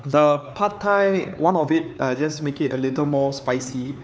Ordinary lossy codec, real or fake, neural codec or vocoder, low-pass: none; fake; codec, 16 kHz, 4 kbps, X-Codec, HuBERT features, trained on LibriSpeech; none